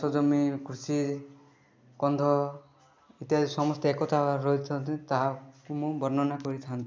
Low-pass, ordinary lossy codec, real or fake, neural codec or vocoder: none; none; real; none